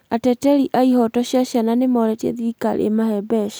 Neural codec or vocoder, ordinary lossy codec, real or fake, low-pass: none; none; real; none